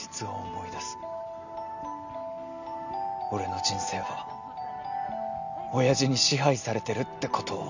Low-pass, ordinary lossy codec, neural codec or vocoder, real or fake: 7.2 kHz; none; none; real